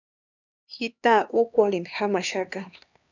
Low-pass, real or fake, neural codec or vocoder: 7.2 kHz; fake; codec, 16 kHz, 2 kbps, X-Codec, HuBERT features, trained on LibriSpeech